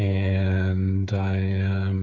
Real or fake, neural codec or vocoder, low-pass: fake; codec, 16 kHz, 8 kbps, FreqCodec, smaller model; 7.2 kHz